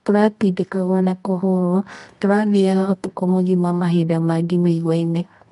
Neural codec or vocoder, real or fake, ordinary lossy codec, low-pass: codec, 24 kHz, 0.9 kbps, WavTokenizer, medium music audio release; fake; MP3, 64 kbps; 10.8 kHz